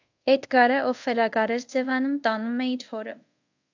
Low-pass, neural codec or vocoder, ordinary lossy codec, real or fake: 7.2 kHz; codec, 24 kHz, 0.5 kbps, DualCodec; AAC, 48 kbps; fake